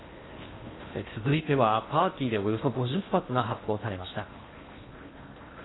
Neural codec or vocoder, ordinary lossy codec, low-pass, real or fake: codec, 16 kHz in and 24 kHz out, 0.8 kbps, FocalCodec, streaming, 65536 codes; AAC, 16 kbps; 7.2 kHz; fake